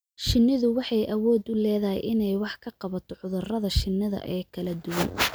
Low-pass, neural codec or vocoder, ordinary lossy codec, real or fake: none; none; none; real